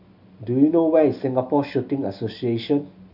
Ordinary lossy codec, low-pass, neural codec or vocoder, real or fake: none; 5.4 kHz; none; real